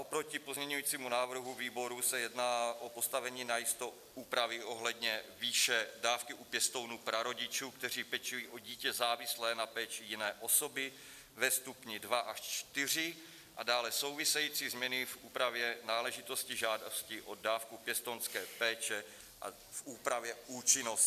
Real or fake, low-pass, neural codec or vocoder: real; 14.4 kHz; none